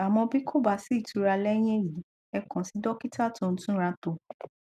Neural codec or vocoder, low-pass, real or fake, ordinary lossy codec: none; 14.4 kHz; real; none